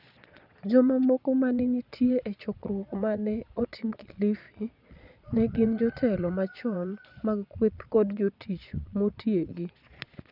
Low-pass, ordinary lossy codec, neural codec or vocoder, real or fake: 5.4 kHz; none; vocoder, 22.05 kHz, 80 mel bands, Vocos; fake